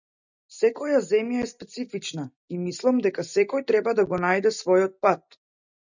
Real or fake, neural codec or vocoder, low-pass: real; none; 7.2 kHz